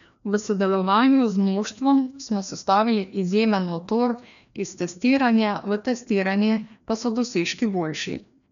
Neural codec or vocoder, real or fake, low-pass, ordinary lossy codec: codec, 16 kHz, 1 kbps, FreqCodec, larger model; fake; 7.2 kHz; none